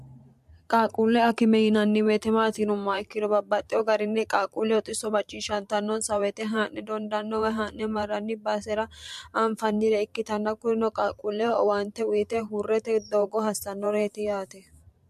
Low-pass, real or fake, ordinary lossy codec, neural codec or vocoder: 14.4 kHz; fake; MP3, 64 kbps; vocoder, 44.1 kHz, 128 mel bands every 512 samples, BigVGAN v2